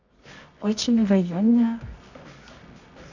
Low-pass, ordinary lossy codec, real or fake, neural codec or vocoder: 7.2 kHz; none; fake; codec, 24 kHz, 0.9 kbps, WavTokenizer, medium music audio release